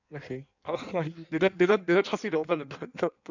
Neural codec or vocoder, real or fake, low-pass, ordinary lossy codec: codec, 16 kHz in and 24 kHz out, 1.1 kbps, FireRedTTS-2 codec; fake; 7.2 kHz; none